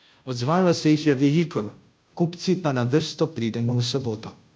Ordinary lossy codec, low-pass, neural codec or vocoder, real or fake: none; none; codec, 16 kHz, 0.5 kbps, FunCodec, trained on Chinese and English, 25 frames a second; fake